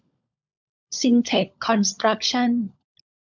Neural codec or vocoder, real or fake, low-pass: codec, 16 kHz, 16 kbps, FunCodec, trained on LibriTTS, 50 frames a second; fake; 7.2 kHz